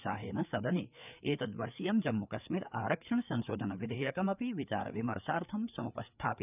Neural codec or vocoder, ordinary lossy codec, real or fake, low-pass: codec, 16 kHz, 4 kbps, FreqCodec, larger model; none; fake; 3.6 kHz